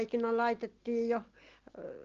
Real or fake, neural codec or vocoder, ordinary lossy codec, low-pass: real; none; Opus, 16 kbps; 7.2 kHz